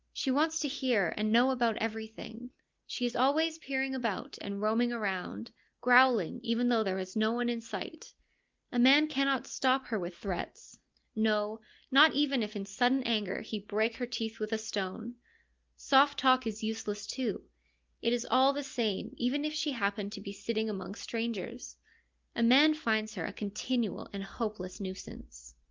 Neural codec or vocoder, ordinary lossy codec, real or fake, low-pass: none; Opus, 32 kbps; real; 7.2 kHz